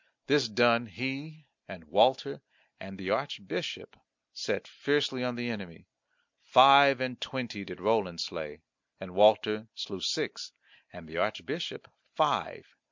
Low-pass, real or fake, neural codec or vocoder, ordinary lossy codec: 7.2 kHz; real; none; MP3, 64 kbps